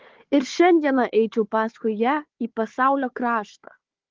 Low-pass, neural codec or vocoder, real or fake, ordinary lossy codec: 7.2 kHz; codec, 16 kHz, 4 kbps, FunCodec, trained on Chinese and English, 50 frames a second; fake; Opus, 16 kbps